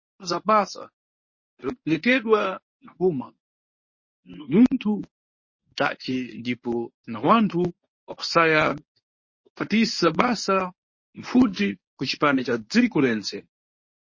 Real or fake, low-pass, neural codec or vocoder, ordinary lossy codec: fake; 7.2 kHz; codec, 24 kHz, 0.9 kbps, WavTokenizer, medium speech release version 1; MP3, 32 kbps